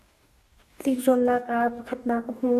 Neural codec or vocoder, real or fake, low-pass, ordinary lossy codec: codec, 44.1 kHz, 2.6 kbps, DAC; fake; 14.4 kHz; AAC, 96 kbps